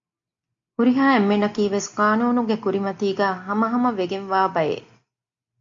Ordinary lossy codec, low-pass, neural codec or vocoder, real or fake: AAC, 64 kbps; 7.2 kHz; none; real